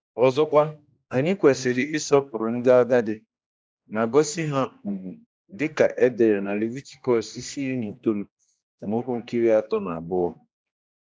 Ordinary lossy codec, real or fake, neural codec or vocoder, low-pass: none; fake; codec, 16 kHz, 1 kbps, X-Codec, HuBERT features, trained on general audio; none